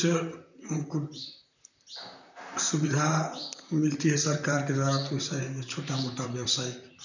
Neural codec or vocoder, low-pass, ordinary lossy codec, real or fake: vocoder, 44.1 kHz, 128 mel bands, Pupu-Vocoder; 7.2 kHz; none; fake